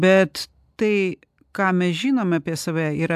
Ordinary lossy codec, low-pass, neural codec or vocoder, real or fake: AAC, 96 kbps; 14.4 kHz; none; real